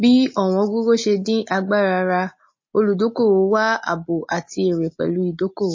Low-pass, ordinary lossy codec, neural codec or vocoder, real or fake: 7.2 kHz; MP3, 32 kbps; none; real